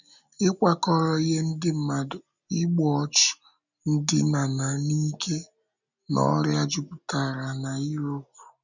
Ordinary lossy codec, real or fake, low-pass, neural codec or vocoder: none; real; 7.2 kHz; none